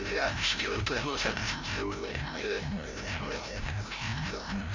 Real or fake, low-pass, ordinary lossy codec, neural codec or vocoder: fake; 7.2 kHz; MP3, 64 kbps; codec, 16 kHz, 0.5 kbps, FreqCodec, larger model